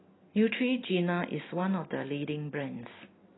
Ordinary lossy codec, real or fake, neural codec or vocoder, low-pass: AAC, 16 kbps; real; none; 7.2 kHz